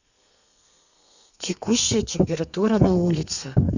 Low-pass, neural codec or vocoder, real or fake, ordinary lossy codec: 7.2 kHz; codec, 32 kHz, 1.9 kbps, SNAC; fake; none